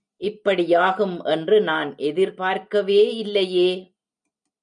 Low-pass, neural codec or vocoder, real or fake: 9.9 kHz; none; real